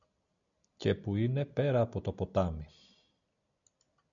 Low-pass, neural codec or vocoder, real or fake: 7.2 kHz; none; real